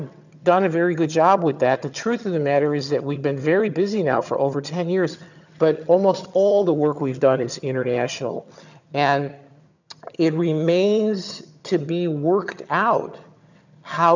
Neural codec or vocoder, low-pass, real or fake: vocoder, 22.05 kHz, 80 mel bands, HiFi-GAN; 7.2 kHz; fake